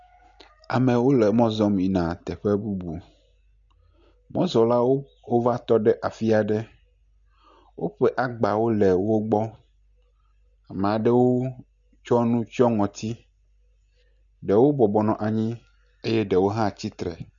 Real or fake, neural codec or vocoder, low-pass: real; none; 7.2 kHz